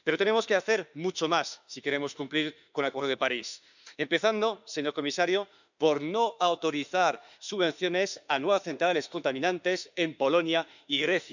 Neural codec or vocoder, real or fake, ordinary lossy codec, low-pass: autoencoder, 48 kHz, 32 numbers a frame, DAC-VAE, trained on Japanese speech; fake; none; 7.2 kHz